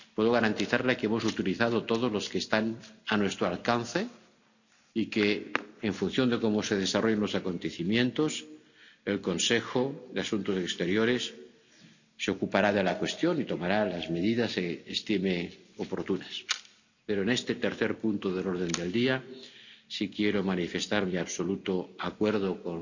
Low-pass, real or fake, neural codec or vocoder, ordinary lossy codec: 7.2 kHz; real; none; none